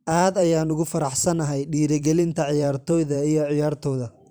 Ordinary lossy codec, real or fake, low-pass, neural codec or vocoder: none; fake; none; vocoder, 44.1 kHz, 128 mel bands every 512 samples, BigVGAN v2